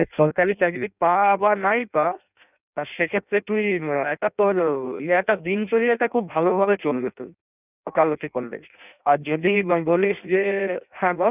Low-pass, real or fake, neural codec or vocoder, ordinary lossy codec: 3.6 kHz; fake; codec, 16 kHz in and 24 kHz out, 0.6 kbps, FireRedTTS-2 codec; none